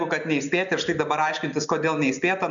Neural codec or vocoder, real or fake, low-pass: none; real; 7.2 kHz